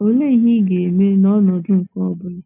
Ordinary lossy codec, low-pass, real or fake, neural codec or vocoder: none; 3.6 kHz; real; none